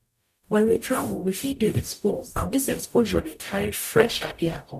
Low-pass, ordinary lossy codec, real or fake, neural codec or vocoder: 14.4 kHz; none; fake; codec, 44.1 kHz, 0.9 kbps, DAC